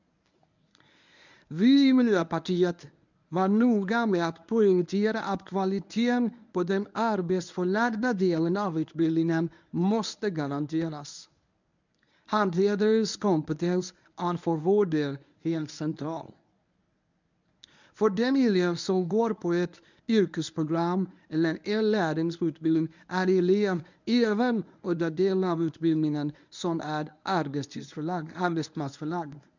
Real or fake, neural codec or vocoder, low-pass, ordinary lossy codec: fake; codec, 24 kHz, 0.9 kbps, WavTokenizer, medium speech release version 1; 7.2 kHz; none